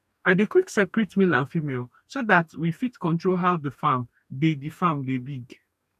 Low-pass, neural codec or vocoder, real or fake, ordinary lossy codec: 14.4 kHz; codec, 44.1 kHz, 2.6 kbps, DAC; fake; none